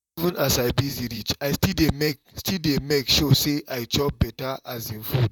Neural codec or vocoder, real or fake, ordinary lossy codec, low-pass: vocoder, 44.1 kHz, 128 mel bands, Pupu-Vocoder; fake; none; 19.8 kHz